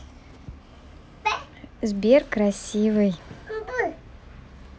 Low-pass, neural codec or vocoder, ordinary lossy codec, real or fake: none; none; none; real